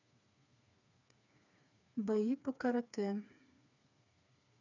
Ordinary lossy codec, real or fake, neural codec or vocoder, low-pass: none; fake; codec, 16 kHz, 4 kbps, FreqCodec, smaller model; 7.2 kHz